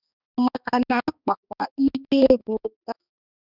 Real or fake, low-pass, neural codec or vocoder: fake; 5.4 kHz; codec, 16 kHz, 2 kbps, X-Codec, HuBERT features, trained on balanced general audio